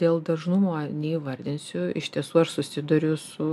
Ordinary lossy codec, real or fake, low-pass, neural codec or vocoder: MP3, 96 kbps; real; 14.4 kHz; none